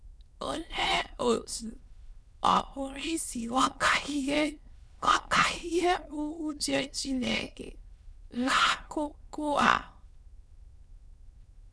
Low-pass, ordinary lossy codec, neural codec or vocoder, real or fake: none; none; autoencoder, 22.05 kHz, a latent of 192 numbers a frame, VITS, trained on many speakers; fake